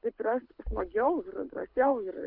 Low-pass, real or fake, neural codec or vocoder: 5.4 kHz; fake; codec, 24 kHz, 6 kbps, HILCodec